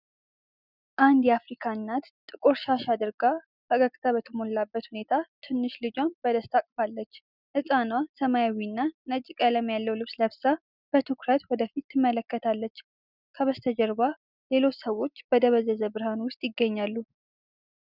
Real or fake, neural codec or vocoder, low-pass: real; none; 5.4 kHz